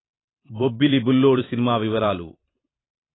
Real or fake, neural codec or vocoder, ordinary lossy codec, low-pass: fake; vocoder, 44.1 kHz, 128 mel bands every 512 samples, BigVGAN v2; AAC, 16 kbps; 7.2 kHz